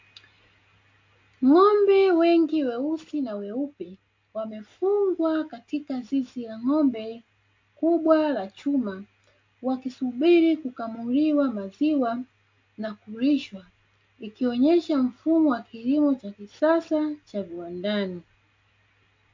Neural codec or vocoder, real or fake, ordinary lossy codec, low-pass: none; real; MP3, 48 kbps; 7.2 kHz